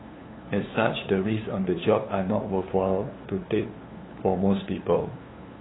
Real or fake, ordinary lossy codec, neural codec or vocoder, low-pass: fake; AAC, 16 kbps; codec, 16 kHz, 2 kbps, FunCodec, trained on LibriTTS, 25 frames a second; 7.2 kHz